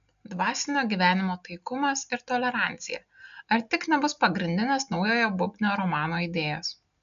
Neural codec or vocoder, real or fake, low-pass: none; real; 7.2 kHz